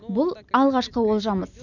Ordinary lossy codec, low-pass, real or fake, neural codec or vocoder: none; 7.2 kHz; real; none